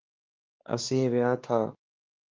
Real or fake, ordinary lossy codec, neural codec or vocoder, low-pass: fake; Opus, 32 kbps; codec, 16 kHz, 2 kbps, X-Codec, WavLM features, trained on Multilingual LibriSpeech; 7.2 kHz